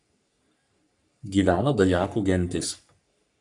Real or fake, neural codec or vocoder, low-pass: fake; codec, 44.1 kHz, 3.4 kbps, Pupu-Codec; 10.8 kHz